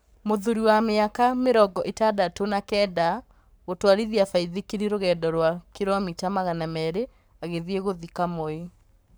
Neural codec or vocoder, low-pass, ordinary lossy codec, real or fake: codec, 44.1 kHz, 7.8 kbps, Pupu-Codec; none; none; fake